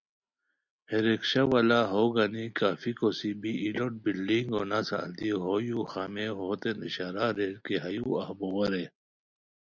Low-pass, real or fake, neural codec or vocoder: 7.2 kHz; real; none